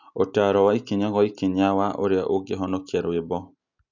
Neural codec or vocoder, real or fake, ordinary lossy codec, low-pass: none; real; none; 7.2 kHz